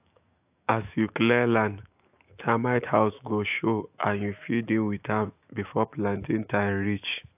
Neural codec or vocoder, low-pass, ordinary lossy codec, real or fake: autoencoder, 48 kHz, 128 numbers a frame, DAC-VAE, trained on Japanese speech; 3.6 kHz; AAC, 32 kbps; fake